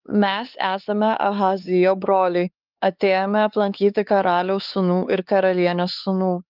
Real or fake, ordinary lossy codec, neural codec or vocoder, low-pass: fake; Opus, 24 kbps; codec, 16 kHz, 4 kbps, X-Codec, HuBERT features, trained on LibriSpeech; 5.4 kHz